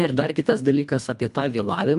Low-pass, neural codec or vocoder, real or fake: 10.8 kHz; codec, 24 kHz, 1.5 kbps, HILCodec; fake